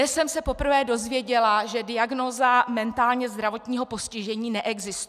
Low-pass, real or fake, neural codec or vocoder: 14.4 kHz; real; none